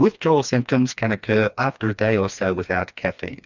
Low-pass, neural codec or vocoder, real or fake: 7.2 kHz; codec, 16 kHz, 2 kbps, FreqCodec, smaller model; fake